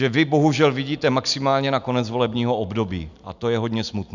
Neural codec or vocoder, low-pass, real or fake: none; 7.2 kHz; real